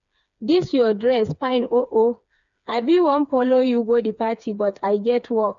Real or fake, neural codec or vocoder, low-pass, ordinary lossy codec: fake; codec, 16 kHz, 4 kbps, FreqCodec, smaller model; 7.2 kHz; none